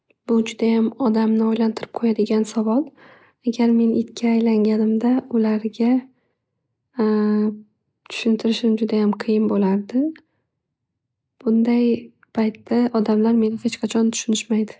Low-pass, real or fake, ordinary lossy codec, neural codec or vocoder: none; real; none; none